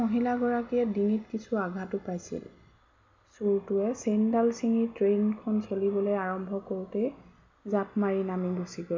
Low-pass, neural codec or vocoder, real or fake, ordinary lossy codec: 7.2 kHz; none; real; MP3, 64 kbps